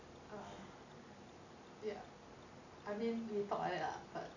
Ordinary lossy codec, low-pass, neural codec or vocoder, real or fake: none; 7.2 kHz; none; real